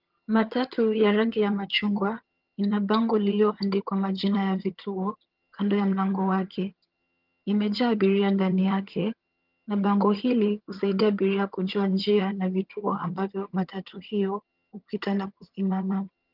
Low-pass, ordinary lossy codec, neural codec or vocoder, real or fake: 5.4 kHz; Opus, 32 kbps; vocoder, 22.05 kHz, 80 mel bands, HiFi-GAN; fake